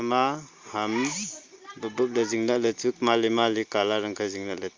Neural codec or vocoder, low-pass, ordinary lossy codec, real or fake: none; 7.2 kHz; Opus, 32 kbps; real